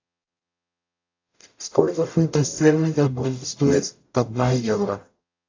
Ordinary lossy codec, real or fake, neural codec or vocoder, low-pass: AAC, 48 kbps; fake; codec, 44.1 kHz, 0.9 kbps, DAC; 7.2 kHz